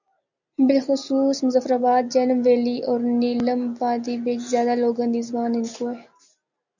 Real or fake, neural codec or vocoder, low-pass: real; none; 7.2 kHz